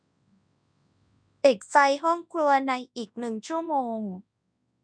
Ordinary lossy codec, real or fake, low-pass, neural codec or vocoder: none; fake; 9.9 kHz; codec, 24 kHz, 0.9 kbps, WavTokenizer, large speech release